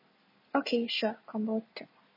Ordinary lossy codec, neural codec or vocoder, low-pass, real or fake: MP3, 24 kbps; none; 5.4 kHz; real